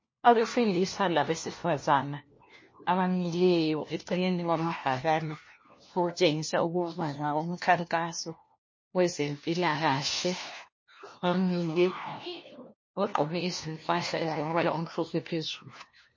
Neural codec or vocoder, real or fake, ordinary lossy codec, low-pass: codec, 16 kHz, 1 kbps, FunCodec, trained on LibriTTS, 50 frames a second; fake; MP3, 32 kbps; 7.2 kHz